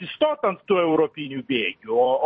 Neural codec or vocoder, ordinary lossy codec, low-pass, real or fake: vocoder, 44.1 kHz, 128 mel bands every 256 samples, BigVGAN v2; MP3, 32 kbps; 10.8 kHz; fake